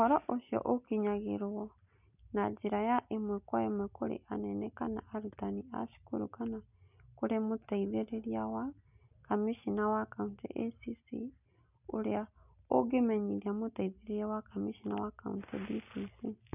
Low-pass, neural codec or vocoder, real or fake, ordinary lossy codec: 3.6 kHz; none; real; none